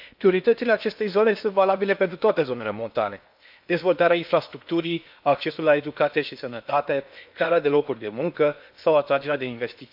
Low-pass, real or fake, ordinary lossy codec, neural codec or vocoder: 5.4 kHz; fake; none; codec, 16 kHz in and 24 kHz out, 0.8 kbps, FocalCodec, streaming, 65536 codes